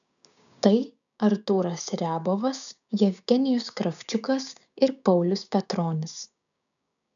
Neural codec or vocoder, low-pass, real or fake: codec, 16 kHz, 6 kbps, DAC; 7.2 kHz; fake